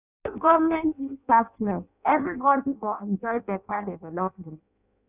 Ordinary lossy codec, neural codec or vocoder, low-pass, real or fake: none; codec, 16 kHz in and 24 kHz out, 0.6 kbps, FireRedTTS-2 codec; 3.6 kHz; fake